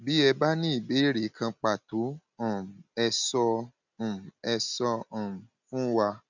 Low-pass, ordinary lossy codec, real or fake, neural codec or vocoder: 7.2 kHz; none; real; none